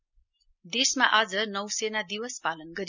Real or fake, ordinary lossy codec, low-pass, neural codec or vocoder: real; none; 7.2 kHz; none